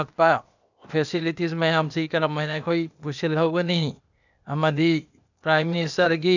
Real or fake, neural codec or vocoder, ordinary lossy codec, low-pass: fake; codec, 16 kHz, 0.8 kbps, ZipCodec; none; 7.2 kHz